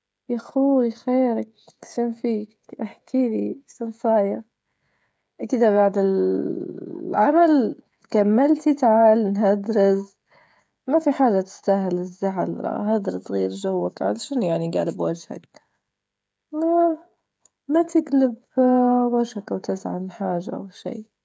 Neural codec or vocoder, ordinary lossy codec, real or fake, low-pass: codec, 16 kHz, 16 kbps, FreqCodec, smaller model; none; fake; none